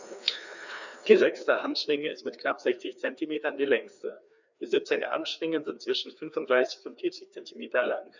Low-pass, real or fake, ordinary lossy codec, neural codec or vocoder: 7.2 kHz; fake; none; codec, 16 kHz, 2 kbps, FreqCodec, larger model